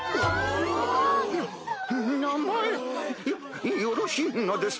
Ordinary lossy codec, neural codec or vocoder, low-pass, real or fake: none; none; none; real